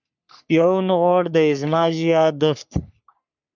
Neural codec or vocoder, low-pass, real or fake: codec, 44.1 kHz, 3.4 kbps, Pupu-Codec; 7.2 kHz; fake